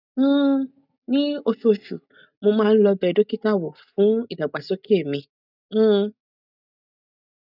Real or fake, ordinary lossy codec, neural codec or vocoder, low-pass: real; none; none; 5.4 kHz